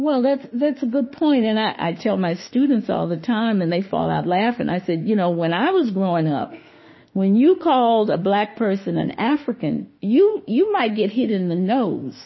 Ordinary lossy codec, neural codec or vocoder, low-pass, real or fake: MP3, 24 kbps; autoencoder, 48 kHz, 32 numbers a frame, DAC-VAE, trained on Japanese speech; 7.2 kHz; fake